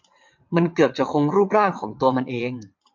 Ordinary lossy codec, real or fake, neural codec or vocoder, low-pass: MP3, 48 kbps; fake; vocoder, 22.05 kHz, 80 mel bands, WaveNeXt; 7.2 kHz